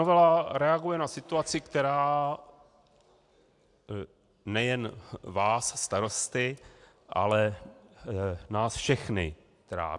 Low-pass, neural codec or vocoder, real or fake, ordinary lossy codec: 10.8 kHz; none; real; AAC, 64 kbps